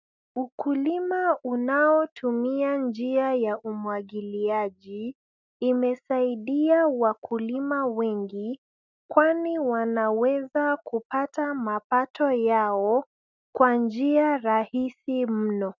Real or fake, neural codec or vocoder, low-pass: real; none; 7.2 kHz